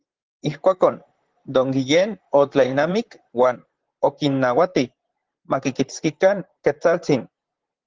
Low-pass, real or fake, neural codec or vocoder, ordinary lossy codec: 7.2 kHz; fake; vocoder, 44.1 kHz, 128 mel bands, Pupu-Vocoder; Opus, 16 kbps